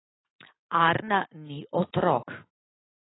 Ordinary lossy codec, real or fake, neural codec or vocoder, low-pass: AAC, 16 kbps; real; none; 7.2 kHz